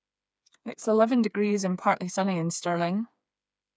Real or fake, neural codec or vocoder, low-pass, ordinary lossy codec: fake; codec, 16 kHz, 4 kbps, FreqCodec, smaller model; none; none